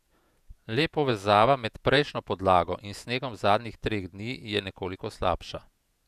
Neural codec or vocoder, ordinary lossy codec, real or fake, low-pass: vocoder, 48 kHz, 128 mel bands, Vocos; none; fake; 14.4 kHz